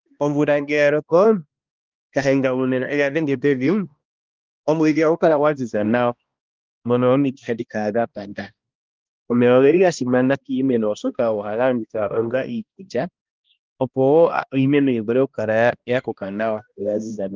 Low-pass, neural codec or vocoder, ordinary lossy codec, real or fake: 7.2 kHz; codec, 16 kHz, 1 kbps, X-Codec, HuBERT features, trained on balanced general audio; Opus, 32 kbps; fake